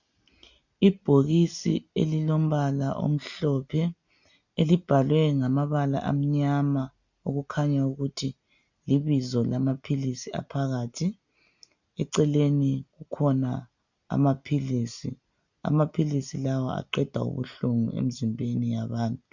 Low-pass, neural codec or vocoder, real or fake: 7.2 kHz; none; real